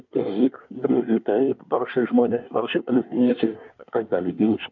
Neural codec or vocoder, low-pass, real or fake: codec, 24 kHz, 1 kbps, SNAC; 7.2 kHz; fake